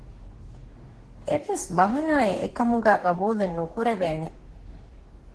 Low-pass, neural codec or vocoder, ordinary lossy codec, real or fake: 10.8 kHz; codec, 44.1 kHz, 2.6 kbps, DAC; Opus, 16 kbps; fake